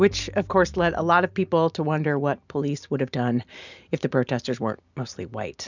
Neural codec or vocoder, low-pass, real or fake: none; 7.2 kHz; real